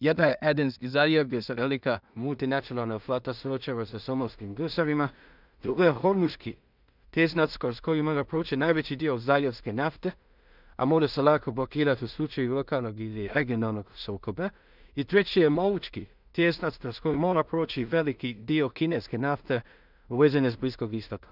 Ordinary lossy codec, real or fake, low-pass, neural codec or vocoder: none; fake; 5.4 kHz; codec, 16 kHz in and 24 kHz out, 0.4 kbps, LongCat-Audio-Codec, two codebook decoder